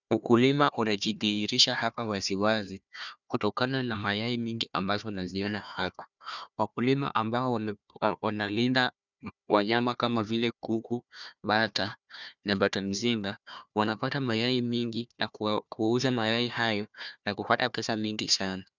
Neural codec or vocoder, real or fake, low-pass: codec, 16 kHz, 1 kbps, FunCodec, trained on Chinese and English, 50 frames a second; fake; 7.2 kHz